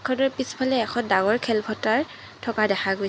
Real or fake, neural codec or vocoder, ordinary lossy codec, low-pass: real; none; none; none